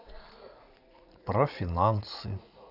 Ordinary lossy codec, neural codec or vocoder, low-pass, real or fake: none; vocoder, 44.1 kHz, 80 mel bands, Vocos; 5.4 kHz; fake